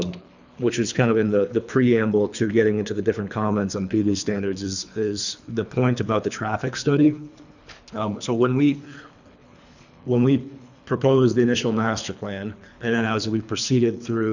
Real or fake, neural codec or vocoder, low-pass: fake; codec, 24 kHz, 3 kbps, HILCodec; 7.2 kHz